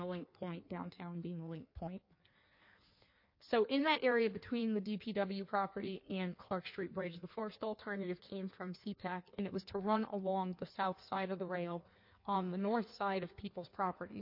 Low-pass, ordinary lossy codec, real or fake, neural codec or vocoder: 5.4 kHz; MP3, 32 kbps; fake; codec, 16 kHz in and 24 kHz out, 1.1 kbps, FireRedTTS-2 codec